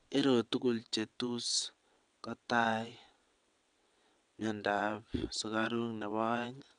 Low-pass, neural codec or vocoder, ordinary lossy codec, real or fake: 9.9 kHz; vocoder, 22.05 kHz, 80 mel bands, WaveNeXt; none; fake